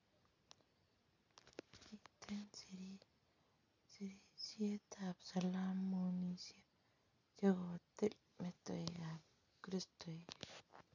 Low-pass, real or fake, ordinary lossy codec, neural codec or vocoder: 7.2 kHz; real; none; none